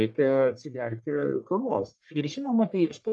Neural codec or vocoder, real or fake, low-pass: codec, 44.1 kHz, 1.7 kbps, Pupu-Codec; fake; 10.8 kHz